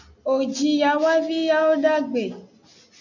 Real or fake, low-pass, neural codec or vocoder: real; 7.2 kHz; none